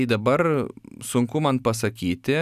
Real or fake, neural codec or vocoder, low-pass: real; none; 14.4 kHz